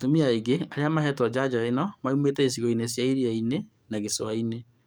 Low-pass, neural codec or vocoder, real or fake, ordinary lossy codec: none; codec, 44.1 kHz, 7.8 kbps, DAC; fake; none